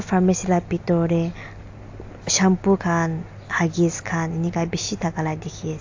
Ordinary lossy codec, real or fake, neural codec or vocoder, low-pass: AAC, 48 kbps; real; none; 7.2 kHz